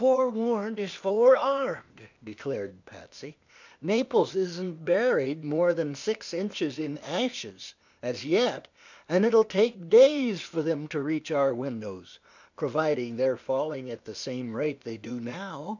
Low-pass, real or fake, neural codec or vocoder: 7.2 kHz; fake; codec, 16 kHz, 0.8 kbps, ZipCodec